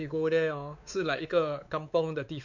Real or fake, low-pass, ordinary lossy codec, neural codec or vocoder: fake; 7.2 kHz; none; codec, 16 kHz, 4 kbps, X-Codec, WavLM features, trained on Multilingual LibriSpeech